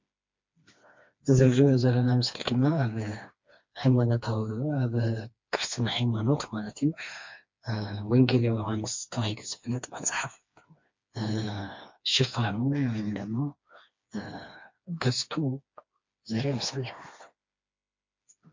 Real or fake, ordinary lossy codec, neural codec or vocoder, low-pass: fake; MP3, 48 kbps; codec, 16 kHz, 2 kbps, FreqCodec, smaller model; 7.2 kHz